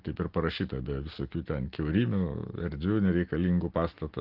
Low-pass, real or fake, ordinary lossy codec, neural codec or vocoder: 5.4 kHz; real; Opus, 16 kbps; none